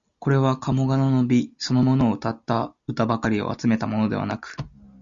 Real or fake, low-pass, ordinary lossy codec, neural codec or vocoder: real; 7.2 kHz; Opus, 64 kbps; none